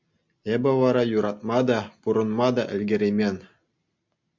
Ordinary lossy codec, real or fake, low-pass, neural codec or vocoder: MP3, 64 kbps; real; 7.2 kHz; none